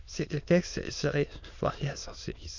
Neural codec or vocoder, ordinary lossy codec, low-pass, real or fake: autoencoder, 22.05 kHz, a latent of 192 numbers a frame, VITS, trained on many speakers; none; 7.2 kHz; fake